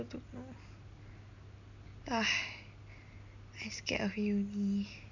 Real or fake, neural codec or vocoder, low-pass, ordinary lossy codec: real; none; 7.2 kHz; none